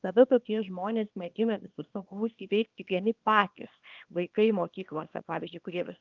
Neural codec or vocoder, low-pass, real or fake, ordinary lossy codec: codec, 24 kHz, 0.9 kbps, WavTokenizer, small release; 7.2 kHz; fake; Opus, 24 kbps